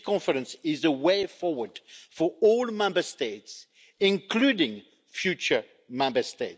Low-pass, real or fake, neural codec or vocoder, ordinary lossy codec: none; real; none; none